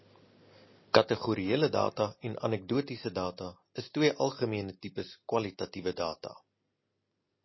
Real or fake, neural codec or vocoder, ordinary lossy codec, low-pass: real; none; MP3, 24 kbps; 7.2 kHz